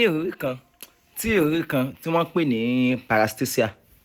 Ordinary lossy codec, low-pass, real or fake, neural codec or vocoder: none; 19.8 kHz; real; none